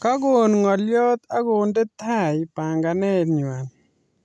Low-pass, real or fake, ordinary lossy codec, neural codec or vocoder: none; real; none; none